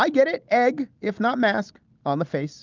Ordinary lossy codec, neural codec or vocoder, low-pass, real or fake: Opus, 32 kbps; none; 7.2 kHz; real